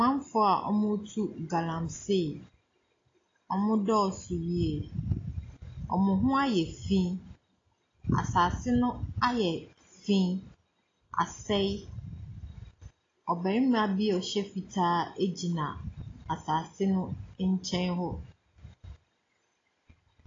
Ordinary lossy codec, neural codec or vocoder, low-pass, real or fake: AAC, 32 kbps; none; 7.2 kHz; real